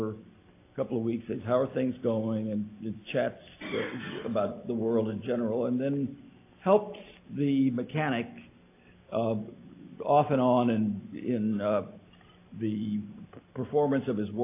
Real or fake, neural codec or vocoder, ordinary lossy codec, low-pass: real; none; AAC, 24 kbps; 3.6 kHz